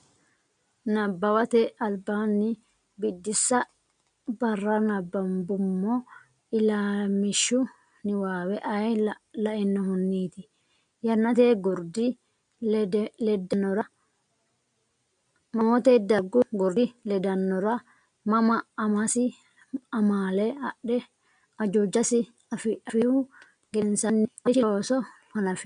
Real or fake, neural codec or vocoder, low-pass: real; none; 9.9 kHz